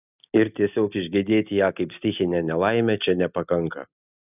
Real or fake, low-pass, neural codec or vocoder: real; 3.6 kHz; none